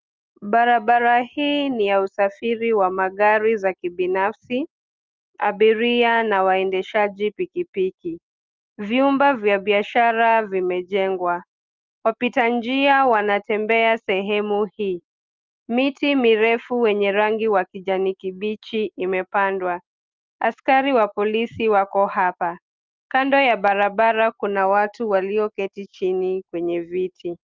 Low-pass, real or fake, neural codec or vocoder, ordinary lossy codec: 7.2 kHz; real; none; Opus, 32 kbps